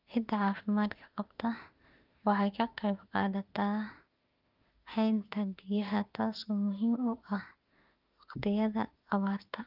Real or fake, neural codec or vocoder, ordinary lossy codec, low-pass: fake; autoencoder, 48 kHz, 32 numbers a frame, DAC-VAE, trained on Japanese speech; Opus, 32 kbps; 5.4 kHz